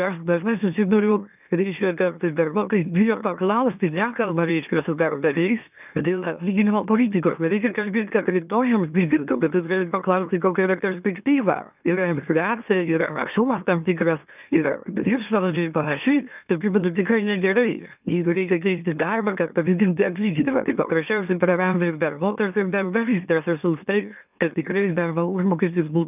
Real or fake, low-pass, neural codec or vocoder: fake; 3.6 kHz; autoencoder, 44.1 kHz, a latent of 192 numbers a frame, MeloTTS